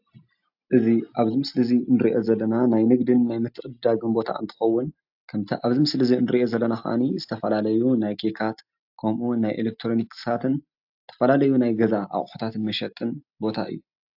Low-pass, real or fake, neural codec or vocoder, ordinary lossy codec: 5.4 kHz; real; none; AAC, 48 kbps